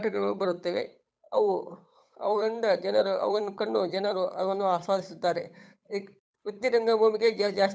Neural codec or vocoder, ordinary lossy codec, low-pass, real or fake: codec, 16 kHz, 8 kbps, FunCodec, trained on Chinese and English, 25 frames a second; none; none; fake